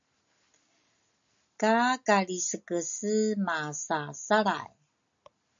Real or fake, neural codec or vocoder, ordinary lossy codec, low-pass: real; none; MP3, 64 kbps; 7.2 kHz